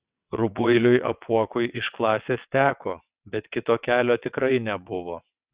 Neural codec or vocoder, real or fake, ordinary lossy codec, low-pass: vocoder, 22.05 kHz, 80 mel bands, WaveNeXt; fake; Opus, 64 kbps; 3.6 kHz